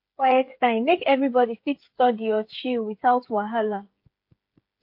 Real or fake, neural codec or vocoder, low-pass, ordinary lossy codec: fake; codec, 16 kHz, 8 kbps, FreqCodec, smaller model; 5.4 kHz; MP3, 32 kbps